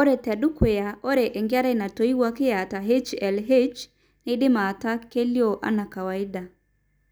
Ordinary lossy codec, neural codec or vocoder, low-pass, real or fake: none; none; none; real